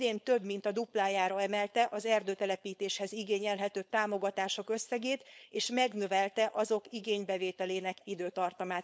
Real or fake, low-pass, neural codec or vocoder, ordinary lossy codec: fake; none; codec, 16 kHz, 4.8 kbps, FACodec; none